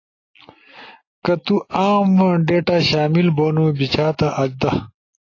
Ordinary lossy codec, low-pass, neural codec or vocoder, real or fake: AAC, 32 kbps; 7.2 kHz; none; real